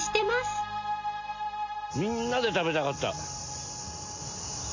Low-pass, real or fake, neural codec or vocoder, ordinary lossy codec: 7.2 kHz; real; none; AAC, 48 kbps